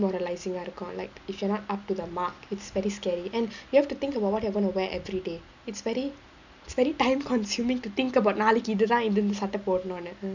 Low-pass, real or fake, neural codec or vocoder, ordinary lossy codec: 7.2 kHz; real; none; none